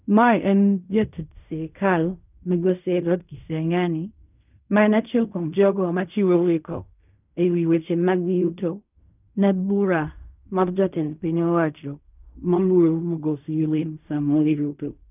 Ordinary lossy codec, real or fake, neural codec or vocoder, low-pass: none; fake; codec, 16 kHz in and 24 kHz out, 0.4 kbps, LongCat-Audio-Codec, fine tuned four codebook decoder; 3.6 kHz